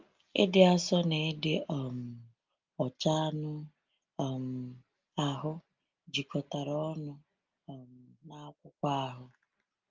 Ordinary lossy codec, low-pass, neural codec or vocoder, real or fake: Opus, 24 kbps; 7.2 kHz; none; real